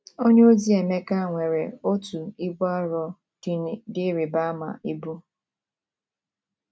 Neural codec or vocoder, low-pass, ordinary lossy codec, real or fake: none; none; none; real